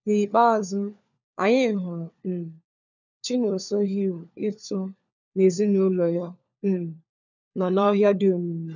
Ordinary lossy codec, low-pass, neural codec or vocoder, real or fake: none; 7.2 kHz; codec, 16 kHz, 4 kbps, FunCodec, trained on LibriTTS, 50 frames a second; fake